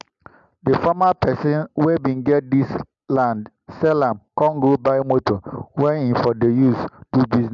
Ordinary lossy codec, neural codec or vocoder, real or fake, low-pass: MP3, 96 kbps; none; real; 7.2 kHz